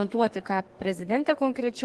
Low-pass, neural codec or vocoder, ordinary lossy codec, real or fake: 10.8 kHz; codec, 32 kHz, 1.9 kbps, SNAC; Opus, 16 kbps; fake